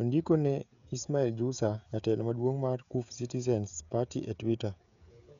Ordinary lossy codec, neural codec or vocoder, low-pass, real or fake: none; codec, 16 kHz, 16 kbps, FreqCodec, smaller model; 7.2 kHz; fake